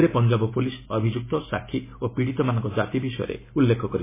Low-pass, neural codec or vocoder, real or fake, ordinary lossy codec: 3.6 kHz; none; real; MP3, 16 kbps